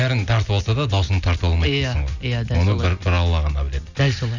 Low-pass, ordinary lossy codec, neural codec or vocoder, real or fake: 7.2 kHz; none; none; real